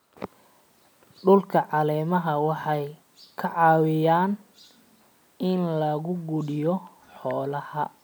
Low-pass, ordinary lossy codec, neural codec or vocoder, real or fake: none; none; none; real